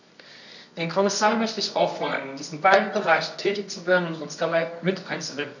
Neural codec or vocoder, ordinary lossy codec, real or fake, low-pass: codec, 24 kHz, 0.9 kbps, WavTokenizer, medium music audio release; none; fake; 7.2 kHz